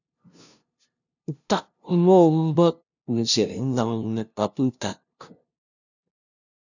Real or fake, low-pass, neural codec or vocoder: fake; 7.2 kHz; codec, 16 kHz, 0.5 kbps, FunCodec, trained on LibriTTS, 25 frames a second